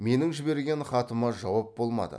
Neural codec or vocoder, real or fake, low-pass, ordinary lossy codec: none; real; none; none